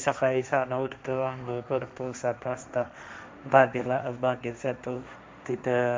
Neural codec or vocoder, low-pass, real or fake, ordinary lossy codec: codec, 16 kHz, 1.1 kbps, Voila-Tokenizer; none; fake; none